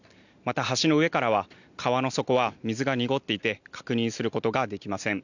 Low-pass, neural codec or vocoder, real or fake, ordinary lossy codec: 7.2 kHz; none; real; none